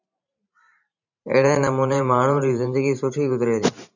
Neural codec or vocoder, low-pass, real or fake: vocoder, 44.1 kHz, 128 mel bands every 512 samples, BigVGAN v2; 7.2 kHz; fake